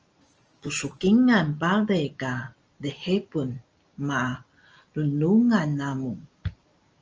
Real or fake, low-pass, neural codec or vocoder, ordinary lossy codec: real; 7.2 kHz; none; Opus, 24 kbps